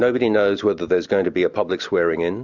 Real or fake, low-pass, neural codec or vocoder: real; 7.2 kHz; none